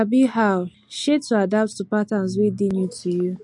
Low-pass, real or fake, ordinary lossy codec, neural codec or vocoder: 10.8 kHz; real; MP3, 48 kbps; none